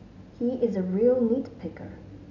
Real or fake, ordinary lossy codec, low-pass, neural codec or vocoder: real; none; 7.2 kHz; none